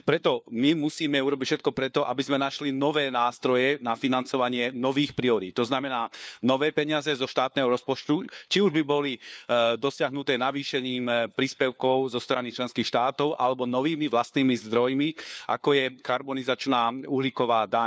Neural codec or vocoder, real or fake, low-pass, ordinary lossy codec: codec, 16 kHz, 4 kbps, FunCodec, trained on LibriTTS, 50 frames a second; fake; none; none